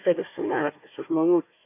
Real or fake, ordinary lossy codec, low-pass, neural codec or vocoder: fake; MP3, 24 kbps; 3.6 kHz; codec, 16 kHz, 1 kbps, FunCodec, trained on Chinese and English, 50 frames a second